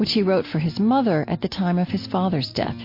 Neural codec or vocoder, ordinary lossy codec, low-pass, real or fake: none; MP3, 32 kbps; 5.4 kHz; real